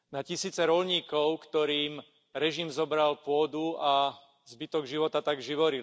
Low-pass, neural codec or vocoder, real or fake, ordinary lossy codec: none; none; real; none